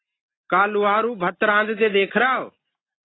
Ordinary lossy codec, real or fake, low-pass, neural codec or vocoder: AAC, 16 kbps; real; 7.2 kHz; none